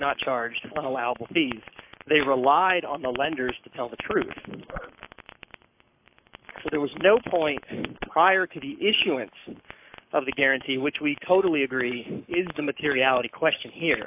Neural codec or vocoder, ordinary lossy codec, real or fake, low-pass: codec, 44.1 kHz, 7.8 kbps, Pupu-Codec; AAC, 32 kbps; fake; 3.6 kHz